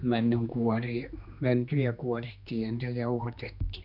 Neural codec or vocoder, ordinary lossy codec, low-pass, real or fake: codec, 16 kHz, 2 kbps, X-Codec, HuBERT features, trained on general audio; none; 5.4 kHz; fake